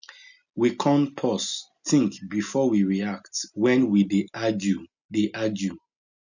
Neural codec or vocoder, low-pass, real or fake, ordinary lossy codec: none; 7.2 kHz; real; none